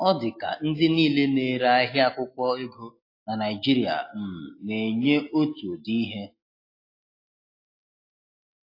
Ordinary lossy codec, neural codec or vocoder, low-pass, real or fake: AAC, 32 kbps; none; 5.4 kHz; real